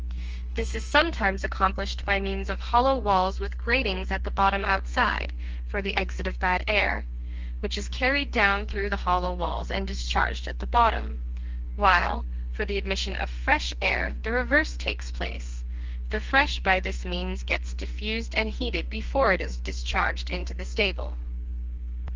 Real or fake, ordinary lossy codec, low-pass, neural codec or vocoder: fake; Opus, 24 kbps; 7.2 kHz; codec, 32 kHz, 1.9 kbps, SNAC